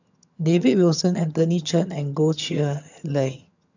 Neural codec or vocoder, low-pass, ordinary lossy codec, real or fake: vocoder, 22.05 kHz, 80 mel bands, HiFi-GAN; 7.2 kHz; none; fake